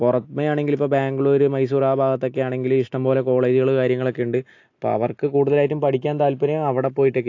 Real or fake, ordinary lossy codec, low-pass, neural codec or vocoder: real; AAC, 48 kbps; 7.2 kHz; none